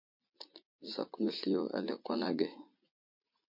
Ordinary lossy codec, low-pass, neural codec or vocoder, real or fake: MP3, 32 kbps; 5.4 kHz; vocoder, 44.1 kHz, 80 mel bands, Vocos; fake